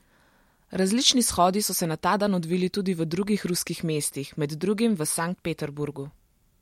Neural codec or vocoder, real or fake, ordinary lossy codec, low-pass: none; real; MP3, 64 kbps; 19.8 kHz